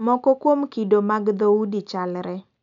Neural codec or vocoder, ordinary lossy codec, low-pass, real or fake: none; none; 7.2 kHz; real